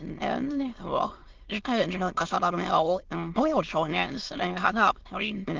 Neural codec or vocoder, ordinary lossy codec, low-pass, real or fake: autoencoder, 22.05 kHz, a latent of 192 numbers a frame, VITS, trained on many speakers; Opus, 16 kbps; 7.2 kHz; fake